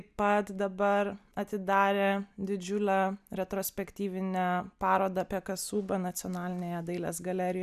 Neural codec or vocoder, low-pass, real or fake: none; 14.4 kHz; real